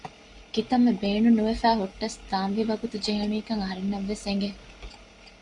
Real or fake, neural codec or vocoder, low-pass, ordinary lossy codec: real; none; 10.8 kHz; Opus, 64 kbps